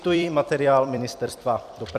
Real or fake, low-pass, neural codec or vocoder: fake; 14.4 kHz; vocoder, 44.1 kHz, 128 mel bands every 256 samples, BigVGAN v2